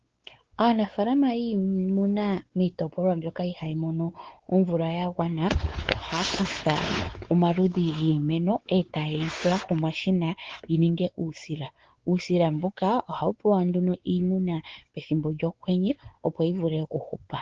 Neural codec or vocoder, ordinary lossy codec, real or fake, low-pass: codec, 16 kHz, 4 kbps, X-Codec, WavLM features, trained on Multilingual LibriSpeech; Opus, 16 kbps; fake; 7.2 kHz